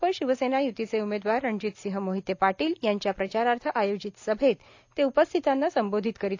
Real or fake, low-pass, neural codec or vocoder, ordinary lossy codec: real; 7.2 kHz; none; AAC, 48 kbps